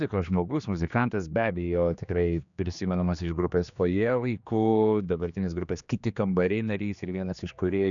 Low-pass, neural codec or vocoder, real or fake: 7.2 kHz; codec, 16 kHz, 2 kbps, X-Codec, HuBERT features, trained on general audio; fake